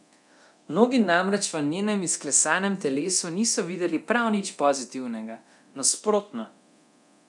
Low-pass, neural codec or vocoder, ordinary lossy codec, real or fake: 10.8 kHz; codec, 24 kHz, 0.9 kbps, DualCodec; none; fake